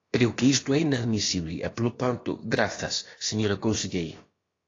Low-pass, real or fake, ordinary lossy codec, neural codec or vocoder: 7.2 kHz; fake; AAC, 32 kbps; codec, 16 kHz, about 1 kbps, DyCAST, with the encoder's durations